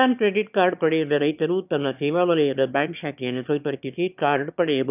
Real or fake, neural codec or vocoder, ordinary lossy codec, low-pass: fake; autoencoder, 22.05 kHz, a latent of 192 numbers a frame, VITS, trained on one speaker; none; 3.6 kHz